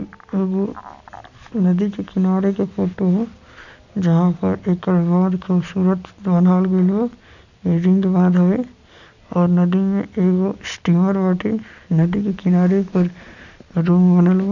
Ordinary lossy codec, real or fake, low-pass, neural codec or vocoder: none; real; 7.2 kHz; none